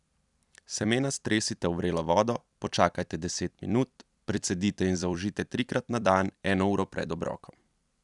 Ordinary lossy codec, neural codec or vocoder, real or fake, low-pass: none; vocoder, 44.1 kHz, 128 mel bands every 256 samples, BigVGAN v2; fake; 10.8 kHz